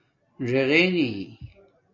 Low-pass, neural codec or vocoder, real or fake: 7.2 kHz; none; real